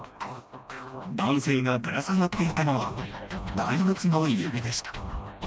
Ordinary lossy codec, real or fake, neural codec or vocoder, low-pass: none; fake; codec, 16 kHz, 1 kbps, FreqCodec, smaller model; none